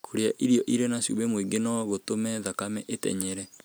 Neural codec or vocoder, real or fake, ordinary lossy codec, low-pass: none; real; none; none